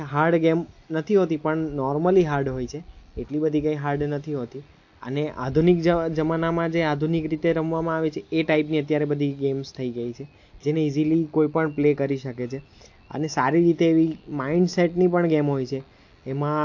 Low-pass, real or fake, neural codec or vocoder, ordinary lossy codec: 7.2 kHz; real; none; AAC, 48 kbps